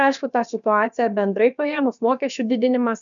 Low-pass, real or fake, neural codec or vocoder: 7.2 kHz; fake; codec, 16 kHz, about 1 kbps, DyCAST, with the encoder's durations